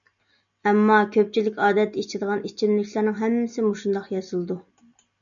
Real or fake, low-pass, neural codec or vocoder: real; 7.2 kHz; none